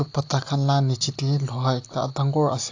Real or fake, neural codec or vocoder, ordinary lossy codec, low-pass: real; none; AAC, 48 kbps; 7.2 kHz